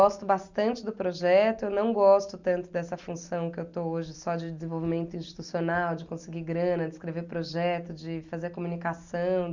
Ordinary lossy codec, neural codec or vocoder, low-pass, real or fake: Opus, 64 kbps; none; 7.2 kHz; real